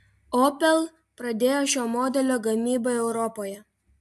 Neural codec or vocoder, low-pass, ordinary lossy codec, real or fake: none; 14.4 kHz; AAC, 96 kbps; real